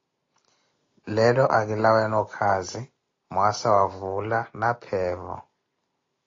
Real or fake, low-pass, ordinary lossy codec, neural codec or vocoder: real; 7.2 kHz; AAC, 32 kbps; none